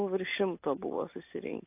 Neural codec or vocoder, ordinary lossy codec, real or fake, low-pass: none; AAC, 24 kbps; real; 3.6 kHz